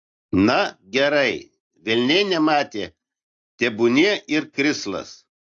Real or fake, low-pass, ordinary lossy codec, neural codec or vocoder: real; 7.2 kHz; AAC, 48 kbps; none